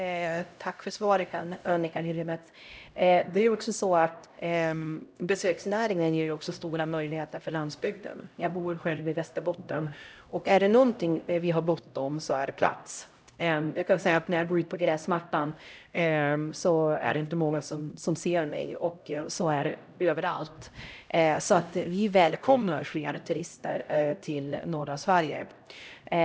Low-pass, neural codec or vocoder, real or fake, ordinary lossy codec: none; codec, 16 kHz, 0.5 kbps, X-Codec, HuBERT features, trained on LibriSpeech; fake; none